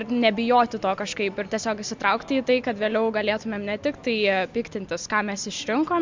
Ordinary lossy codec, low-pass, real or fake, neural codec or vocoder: MP3, 64 kbps; 7.2 kHz; real; none